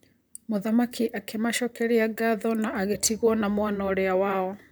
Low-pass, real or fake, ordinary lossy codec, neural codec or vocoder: none; fake; none; vocoder, 44.1 kHz, 128 mel bands, Pupu-Vocoder